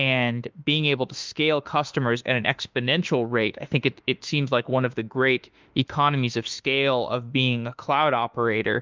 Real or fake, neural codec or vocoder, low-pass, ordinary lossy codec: fake; autoencoder, 48 kHz, 32 numbers a frame, DAC-VAE, trained on Japanese speech; 7.2 kHz; Opus, 24 kbps